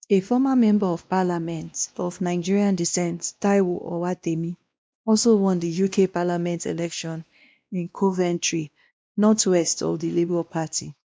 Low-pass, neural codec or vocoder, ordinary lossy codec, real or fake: none; codec, 16 kHz, 1 kbps, X-Codec, WavLM features, trained on Multilingual LibriSpeech; none; fake